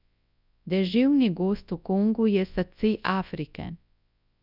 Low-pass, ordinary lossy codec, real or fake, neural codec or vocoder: 5.4 kHz; none; fake; codec, 24 kHz, 0.9 kbps, WavTokenizer, large speech release